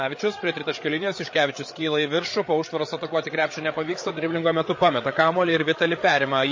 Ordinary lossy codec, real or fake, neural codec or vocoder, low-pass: MP3, 32 kbps; fake; codec, 16 kHz, 8 kbps, FreqCodec, larger model; 7.2 kHz